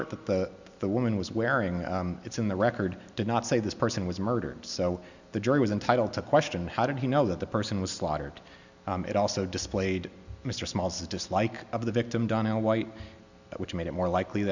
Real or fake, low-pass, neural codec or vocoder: real; 7.2 kHz; none